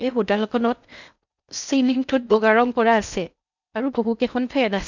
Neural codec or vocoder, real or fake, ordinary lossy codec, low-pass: codec, 16 kHz in and 24 kHz out, 0.8 kbps, FocalCodec, streaming, 65536 codes; fake; none; 7.2 kHz